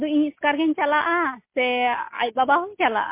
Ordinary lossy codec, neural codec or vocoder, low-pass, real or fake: MP3, 24 kbps; none; 3.6 kHz; real